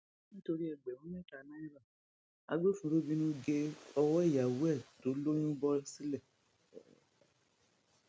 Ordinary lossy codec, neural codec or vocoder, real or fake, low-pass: none; none; real; none